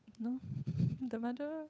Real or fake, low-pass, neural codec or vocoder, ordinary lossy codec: fake; none; codec, 16 kHz, 8 kbps, FunCodec, trained on Chinese and English, 25 frames a second; none